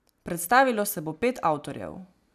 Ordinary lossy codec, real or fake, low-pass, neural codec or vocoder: none; real; 14.4 kHz; none